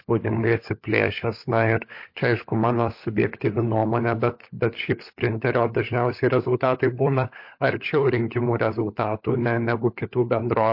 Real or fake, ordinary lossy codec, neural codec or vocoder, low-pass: fake; MP3, 32 kbps; codec, 16 kHz, 16 kbps, FunCodec, trained on LibriTTS, 50 frames a second; 5.4 kHz